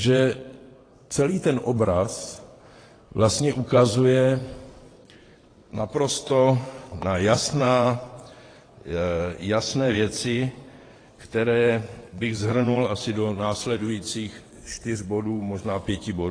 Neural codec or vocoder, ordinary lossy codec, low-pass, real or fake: vocoder, 22.05 kHz, 80 mel bands, WaveNeXt; AAC, 32 kbps; 9.9 kHz; fake